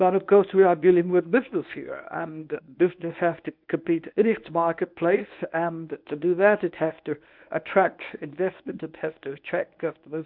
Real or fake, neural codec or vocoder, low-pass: fake; codec, 24 kHz, 0.9 kbps, WavTokenizer, medium speech release version 1; 5.4 kHz